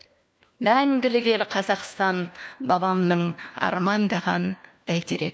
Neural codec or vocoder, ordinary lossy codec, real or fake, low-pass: codec, 16 kHz, 1 kbps, FunCodec, trained on LibriTTS, 50 frames a second; none; fake; none